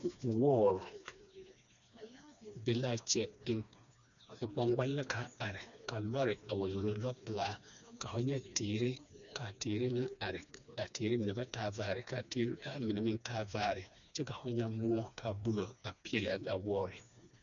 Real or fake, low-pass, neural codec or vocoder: fake; 7.2 kHz; codec, 16 kHz, 2 kbps, FreqCodec, smaller model